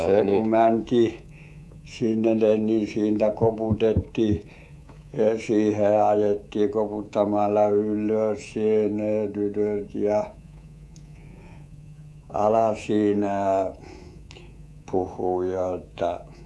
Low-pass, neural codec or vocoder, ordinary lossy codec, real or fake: none; codec, 24 kHz, 3.1 kbps, DualCodec; none; fake